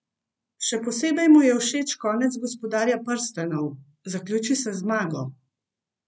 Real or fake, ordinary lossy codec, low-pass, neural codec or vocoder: real; none; none; none